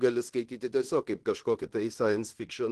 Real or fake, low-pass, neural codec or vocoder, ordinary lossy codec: fake; 10.8 kHz; codec, 16 kHz in and 24 kHz out, 0.9 kbps, LongCat-Audio-Codec, fine tuned four codebook decoder; Opus, 16 kbps